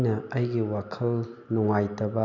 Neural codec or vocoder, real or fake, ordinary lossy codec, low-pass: none; real; none; 7.2 kHz